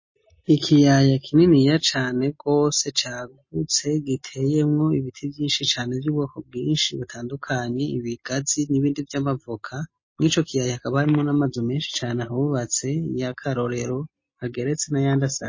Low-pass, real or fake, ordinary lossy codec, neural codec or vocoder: 7.2 kHz; real; MP3, 32 kbps; none